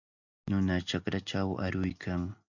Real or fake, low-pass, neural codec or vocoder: real; 7.2 kHz; none